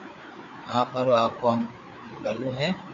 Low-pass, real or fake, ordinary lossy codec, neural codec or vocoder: 7.2 kHz; fake; AAC, 64 kbps; codec, 16 kHz, 4 kbps, FreqCodec, larger model